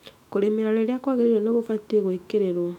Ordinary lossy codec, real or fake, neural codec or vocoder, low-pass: none; fake; autoencoder, 48 kHz, 128 numbers a frame, DAC-VAE, trained on Japanese speech; 19.8 kHz